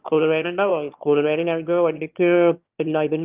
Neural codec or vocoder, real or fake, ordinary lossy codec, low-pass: autoencoder, 22.05 kHz, a latent of 192 numbers a frame, VITS, trained on one speaker; fake; Opus, 24 kbps; 3.6 kHz